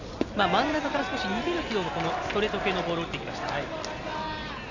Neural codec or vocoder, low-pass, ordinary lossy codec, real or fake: none; 7.2 kHz; none; real